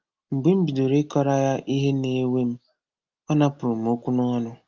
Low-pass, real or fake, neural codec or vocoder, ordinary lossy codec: 7.2 kHz; real; none; Opus, 32 kbps